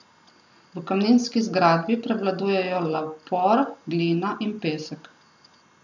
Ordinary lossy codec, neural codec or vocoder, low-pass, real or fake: none; none; 7.2 kHz; real